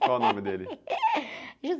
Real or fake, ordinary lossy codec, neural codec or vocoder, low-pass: real; none; none; none